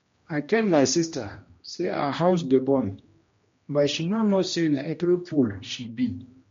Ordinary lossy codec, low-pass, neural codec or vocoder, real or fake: MP3, 48 kbps; 7.2 kHz; codec, 16 kHz, 1 kbps, X-Codec, HuBERT features, trained on general audio; fake